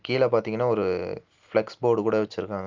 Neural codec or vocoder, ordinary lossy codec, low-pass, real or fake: none; Opus, 32 kbps; 7.2 kHz; real